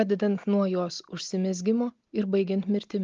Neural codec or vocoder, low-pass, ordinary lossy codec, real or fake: none; 7.2 kHz; Opus, 32 kbps; real